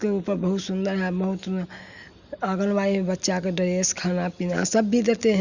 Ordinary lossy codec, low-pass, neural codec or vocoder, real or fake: Opus, 64 kbps; 7.2 kHz; none; real